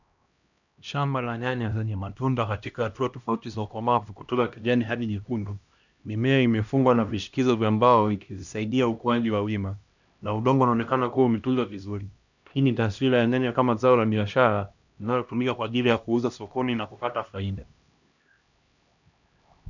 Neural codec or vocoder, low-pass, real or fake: codec, 16 kHz, 1 kbps, X-Codec, HuBERT features, trained on LibriSpeech; 7.2 kHz; fake